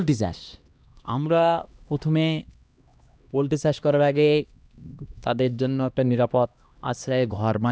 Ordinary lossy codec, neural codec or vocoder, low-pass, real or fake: none; codec, 16 kHz, 1 kbps, X-Codec, HuBERT features, trained on LibriSpeech; none; fake